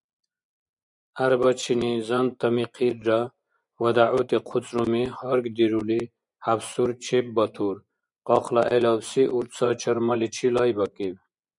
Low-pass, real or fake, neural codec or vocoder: 10.8 kHz; fake; vocoder, 24 kHz, 100 mel bands, Vocos